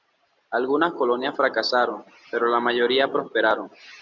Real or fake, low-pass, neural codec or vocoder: real; 7.2 kHz; none